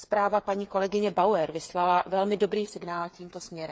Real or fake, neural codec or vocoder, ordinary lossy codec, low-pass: fake; codec, 16 kHz, 8 kbps, FreqCodec, smaller model; none; none